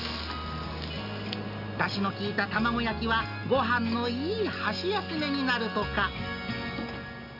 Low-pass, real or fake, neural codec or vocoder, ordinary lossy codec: 5.4 kHz; real; none; AAC, 48 kbps